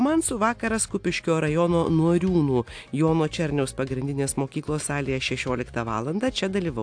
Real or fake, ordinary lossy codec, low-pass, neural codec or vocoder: real; AAC, 64 kbps; 9.9 kHz; none